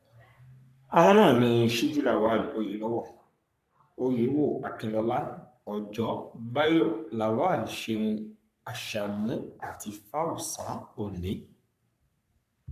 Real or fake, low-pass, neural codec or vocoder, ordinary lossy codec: fake; 14.4 kHz; codec, 44.1 kHz, 3.4 kbps, Pupu-Codec; none